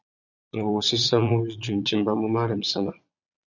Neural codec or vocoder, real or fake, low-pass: vocoder, 22.05 kHz, 80 mel bands, Vocos; fake; 7.2 kHz